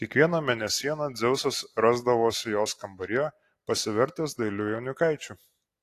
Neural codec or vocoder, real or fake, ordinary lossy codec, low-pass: none; real; AAC, 48 kbps; 14.4 kHz